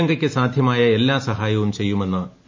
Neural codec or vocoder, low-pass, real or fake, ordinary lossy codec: none; 7.2 kHz; real; MP3, 48 kbps